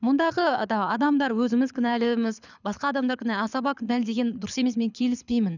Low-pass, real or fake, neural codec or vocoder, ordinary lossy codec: 7.2 kHz; fake; codec, 24 kHz, 6 kbps, HILCodec; none